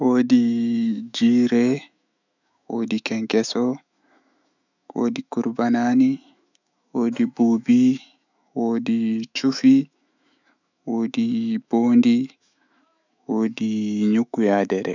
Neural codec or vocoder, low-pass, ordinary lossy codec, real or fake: autoencoder, 48 kHz, 128 numbers a frame, DAC-VAE, trained on Japanese speech; 7.2 kHz; none; fake